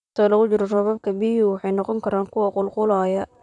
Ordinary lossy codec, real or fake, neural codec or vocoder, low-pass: none; fake; vocoder, 44.1 kHz, 128 mel bands, Pupu-Vocoder; 10.8 kHz